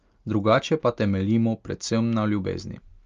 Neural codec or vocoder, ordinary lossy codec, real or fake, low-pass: none; Opus, 32 kbps; real; 7.2 kHz